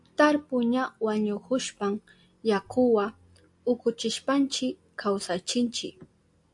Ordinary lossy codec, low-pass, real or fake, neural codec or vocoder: AAC, 64 kbps; 10.8 kHz; real; none